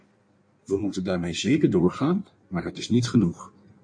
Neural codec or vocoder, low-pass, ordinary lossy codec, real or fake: codec, 16 kHz in and 24 kHz out, 1.1 kbps, FireRedTTS-2 codec; 9.9 kHz; MP3, 48 kbps; fake